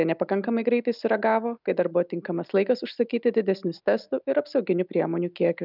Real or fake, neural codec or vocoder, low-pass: real; none; 5.4 kHz